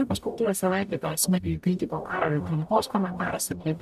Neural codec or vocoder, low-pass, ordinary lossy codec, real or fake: codec, 44.1 kHz, 0.9 kbps, DAC; 14.4 kHz; AAC, 96 kbps; fake